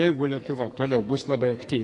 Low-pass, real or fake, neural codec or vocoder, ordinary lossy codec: 10.8 kHz; fake; codec, 44.1 kHz, 2.6 kbps, SNAC; AAC, 48 kbps